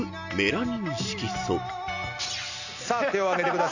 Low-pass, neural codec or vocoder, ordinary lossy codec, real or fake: 7.2 kHz; none; none; real